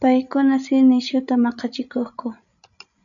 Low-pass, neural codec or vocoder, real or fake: 7.2 kHz; codec, 16 kHz, 16 kbps, FreqCodec, larger model; fake